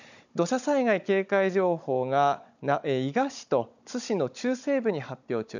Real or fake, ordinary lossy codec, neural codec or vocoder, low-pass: fake; none; codec, 16 kHz, 16 kbps, FunCodec, trained on Chinese and English, 50 frames a second; 7.2 kHz